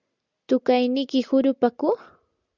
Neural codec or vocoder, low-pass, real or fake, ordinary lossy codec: none; 7.2 kHz; real; Opus, 64 kbps